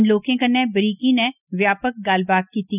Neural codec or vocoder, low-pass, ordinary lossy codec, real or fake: none; 3.6 kHz; none; real